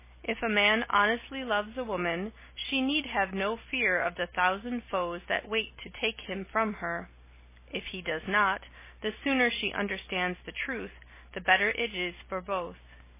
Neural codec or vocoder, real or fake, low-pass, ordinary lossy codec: none; real; 3.6 kHz; MP3, 16 kbps